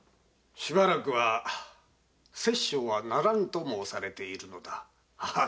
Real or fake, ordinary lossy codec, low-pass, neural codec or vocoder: real; none; none; none